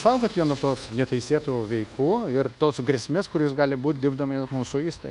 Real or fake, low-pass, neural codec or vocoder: fake; 10.8 kHz; codec, 24 kHz, 1.2 kbps, DualCodec